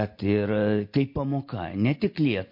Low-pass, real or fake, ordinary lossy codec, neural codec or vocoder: 5.4 kHz; real; MP3, 32 kbps; none